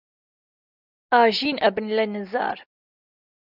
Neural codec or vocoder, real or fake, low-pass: none; real; 5.4 kHz